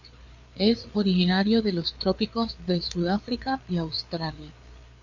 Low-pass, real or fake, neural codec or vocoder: 7.2 kHz; fake; codec, 16 kHz, 4 kbps, FreqCodec, larger model